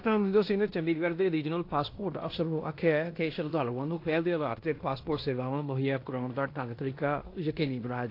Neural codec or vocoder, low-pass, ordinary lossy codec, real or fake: codec, 16 kHz in and 24 kHz out, 0.9 kbps, LongCat-Audio-Codec, fine tuned four codebook decoder; 5.4 kHz; AAC, 32 kbps; fake